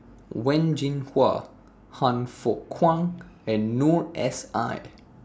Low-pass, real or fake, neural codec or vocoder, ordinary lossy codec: none; real; none; none